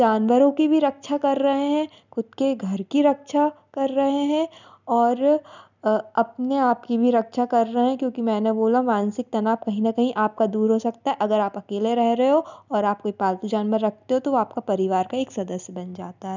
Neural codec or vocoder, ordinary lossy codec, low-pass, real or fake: none; none; 7.2 kHz; real